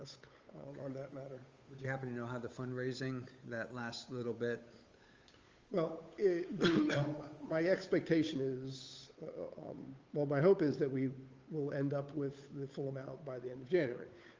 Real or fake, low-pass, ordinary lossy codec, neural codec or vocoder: fake; 7.2 kHz; Opus, 32 kbps; codec, 16 kHz, 8 kbps, FunCodec, trained on Chinese and English, 25 frames a second